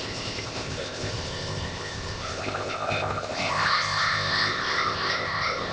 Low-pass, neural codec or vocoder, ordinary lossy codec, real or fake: none; codec, 16 kHz, 0.8 kbps, ZipCodec; none; fake